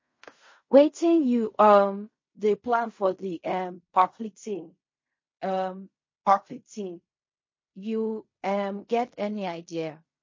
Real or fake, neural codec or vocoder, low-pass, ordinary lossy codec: fake; codec, 16 kHz in and 24 kHz out, 0.4 kbps, LongCat-Audio-Codec, fine tuned four codebook decoder; 7.2 kHz; MP3, 32 kbps